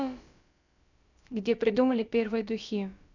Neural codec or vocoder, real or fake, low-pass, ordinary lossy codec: codec, 16 kHz, about 1 kbps, DyCAST, with the encoder's durations; fake; 7.2 kHz; none